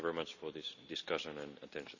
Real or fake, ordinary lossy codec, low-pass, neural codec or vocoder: real; none; 7.2 kHz; none